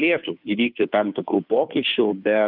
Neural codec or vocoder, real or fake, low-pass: codec, 16 kHz, 2 kbps, FunCodec, trained on Chinese and English, 25 frames a second; fake; 5.4 kHz